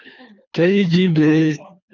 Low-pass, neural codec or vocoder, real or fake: 7.2 kHz; codec, 24 kHz, 3 kbps, HILCodec; fake